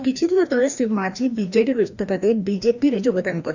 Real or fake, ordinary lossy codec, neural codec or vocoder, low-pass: fake; none; codec, 16 kHz, 1 kbps, FreqCodec, larger model; 7.2 kHz